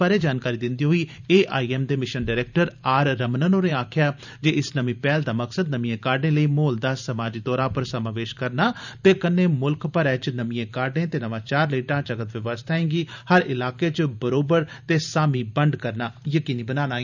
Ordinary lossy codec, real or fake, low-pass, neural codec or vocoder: none; fake; 7.2 kHz; vocoder, 44.1 kHz, 128 mel bands every 512 samples, BigVGAN v2